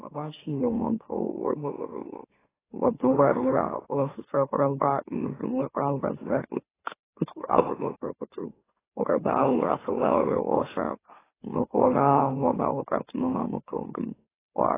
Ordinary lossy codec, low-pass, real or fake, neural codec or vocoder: AAC, 16 kbps; 3.6 kHz; fake; autoencoder, 44.1 kHz, a latent of 192 numbers a frame, MeloTTS